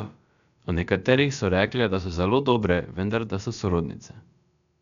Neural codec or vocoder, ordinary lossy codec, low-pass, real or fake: codec, 16 kHz, about 1 kbps, DyCAST, with the encoder's durations; none; 7.2 kHz; fake